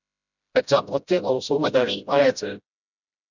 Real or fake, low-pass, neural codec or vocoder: fake; 7.2 kHz; codec, 16 kHz, 0.5 kbps, FreqCodec, smaller model